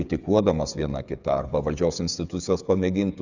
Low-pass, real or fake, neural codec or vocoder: 7.2 kHz; fake; codec, 16 kHz, 8 kbps, FreqCodec, smaller model